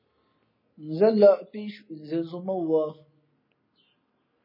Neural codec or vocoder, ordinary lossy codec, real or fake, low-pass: none; MP3, 24 kbps; real; 5.4 kHz